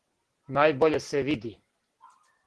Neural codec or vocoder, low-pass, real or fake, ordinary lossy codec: none; 10.8 kHz; real; Opus, 16 kbps